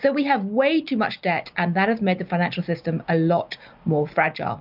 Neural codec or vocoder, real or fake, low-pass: none; real; 5.4 kHz